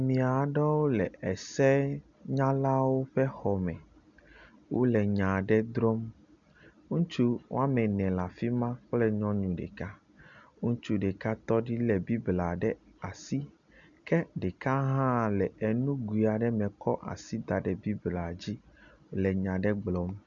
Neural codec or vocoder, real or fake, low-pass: none; real; 7.2 kHz